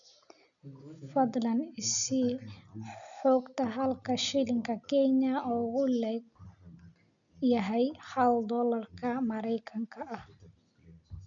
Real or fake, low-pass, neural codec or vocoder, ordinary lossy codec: real; 7.2 kHz; none; none